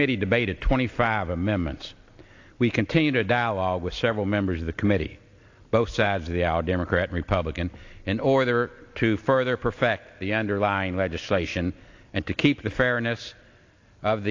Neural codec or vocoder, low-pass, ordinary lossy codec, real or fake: none; 7.2 kHz; AAC, 48 kbps; real